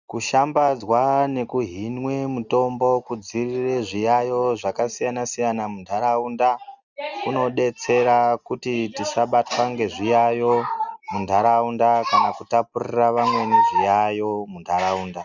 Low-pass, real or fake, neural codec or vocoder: 7.2 kHz; real; none